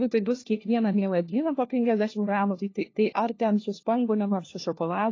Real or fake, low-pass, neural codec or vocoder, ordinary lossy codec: fake; 7.2 kHz; codec, 16 kHz, 1 kbps, FunCodec, trained on LibriTTS, 50 frames a second; AAC, 32 kbps